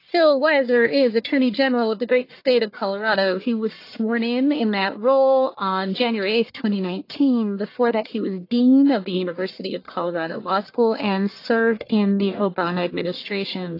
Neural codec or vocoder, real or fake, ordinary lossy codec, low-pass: codec, 44.1 kHz, 1.7 kbps, Pupu-Codec; fake; AAC, 32 kbps; 5.4 kHz